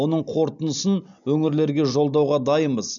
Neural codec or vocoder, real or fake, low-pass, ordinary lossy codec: none; real; 7.2 kHz; none